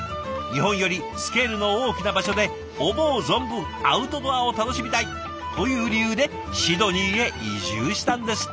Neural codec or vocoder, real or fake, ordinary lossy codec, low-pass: none; real; none; none